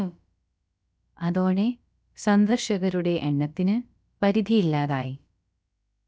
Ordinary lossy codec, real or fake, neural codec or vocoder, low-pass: none; fake; codec, 16 kHz, about 1 kbps, DyCAST, with the encoder's durations; none